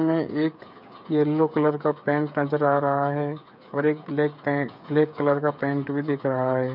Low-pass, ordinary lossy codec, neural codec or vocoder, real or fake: 5.4 kHz; none; codec, 16 kHz, 8 kbps, FreqCodec, smaller model; fake